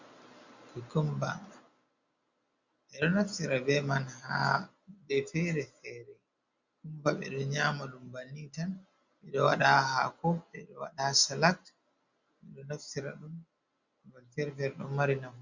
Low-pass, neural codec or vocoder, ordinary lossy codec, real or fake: 7.2 kHz; none; Opus, 64 kbps; real